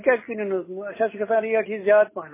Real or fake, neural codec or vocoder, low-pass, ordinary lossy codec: real; none; 3.6 kHz; MP3, 16 kbps